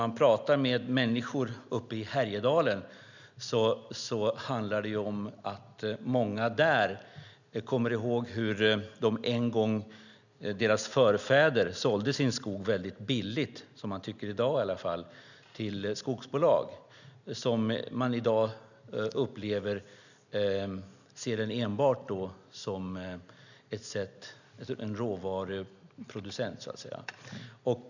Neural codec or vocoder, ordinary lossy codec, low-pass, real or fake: none; none; 7.2 kHz; real